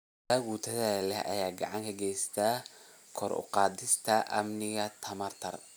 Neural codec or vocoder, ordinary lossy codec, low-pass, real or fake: none; none; none; real